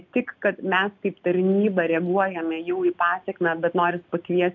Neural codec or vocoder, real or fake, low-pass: none; real; 7.2 kHz